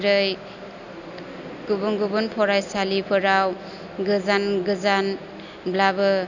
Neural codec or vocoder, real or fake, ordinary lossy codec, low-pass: none; real; none; 7.2 kHz